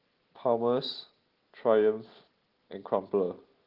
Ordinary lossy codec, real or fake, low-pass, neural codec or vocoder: Opus, 16 kbps; real; 5.4 kHz; none